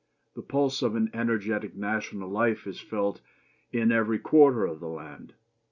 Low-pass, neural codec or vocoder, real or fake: 7.2 kHz; none; real